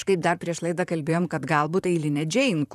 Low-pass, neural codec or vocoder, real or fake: 14.4 kHz; vocoder, 44.1 kHz, 128 mel bands, Pupu-Vocoder; fake